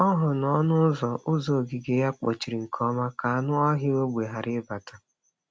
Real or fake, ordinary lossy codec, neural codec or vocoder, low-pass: real; none; none; none